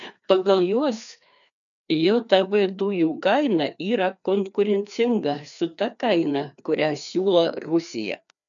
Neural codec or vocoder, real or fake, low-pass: codec, 16 kHz, 2 kbps, FreqCodec, larger model; fake; 7.2 kHz